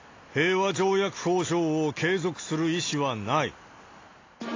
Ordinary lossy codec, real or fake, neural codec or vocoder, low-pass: AAC, 32 kbps; real; none; 7.2 kHz